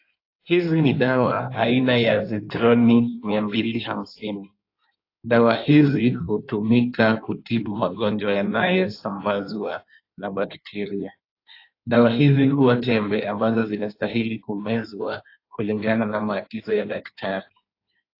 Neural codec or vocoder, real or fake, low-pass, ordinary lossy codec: codec, 16 kHz in and 24 kHz out, 1.1 kbps, FireRedTTS-2 codec; fake; 5.4 kHz; AAC, 32 kbps